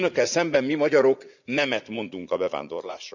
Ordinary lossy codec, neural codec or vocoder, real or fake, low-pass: none; vocoder, 44.1 kHz, 80 mel bands, Vocos; fake; 7.2 kHz